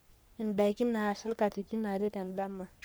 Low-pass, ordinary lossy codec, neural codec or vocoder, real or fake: none; none; codec, 44.1 kHz, 3.4 kbps, Pupu-Codec; fake